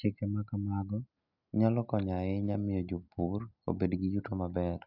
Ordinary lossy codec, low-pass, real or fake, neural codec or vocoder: none; 5.4 kHz; real; none